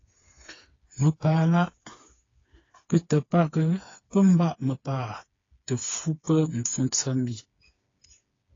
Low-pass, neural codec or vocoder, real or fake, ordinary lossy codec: 7.2 kHz; codec, 16 kHz, 4 kbps, FreqCodec, smaller model; fake; AAC, 32 kbps